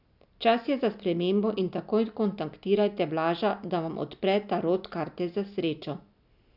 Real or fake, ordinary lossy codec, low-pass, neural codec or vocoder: real; none; 5.4 kHz; none